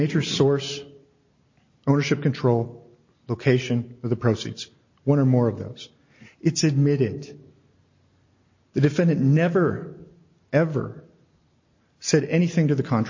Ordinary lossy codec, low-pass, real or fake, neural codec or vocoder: MP3, 32 kbps; 7.2 kHz; real; none